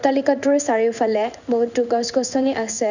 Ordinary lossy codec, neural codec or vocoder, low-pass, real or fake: none; codec, 16 kHz in and 24 kHz out, 1 kbps, XY-Tokenizer; 7.2 kHz; fake